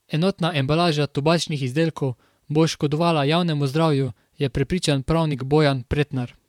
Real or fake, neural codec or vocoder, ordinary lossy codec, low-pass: fake; vocoder, 44.1 kHz, 128 mel bands, Pupu-Vocoder; MP3, 96 kbps; 19.8 kHz